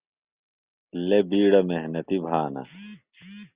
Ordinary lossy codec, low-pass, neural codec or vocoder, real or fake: Opus, 64 kbps; 3.6 kHz; none; real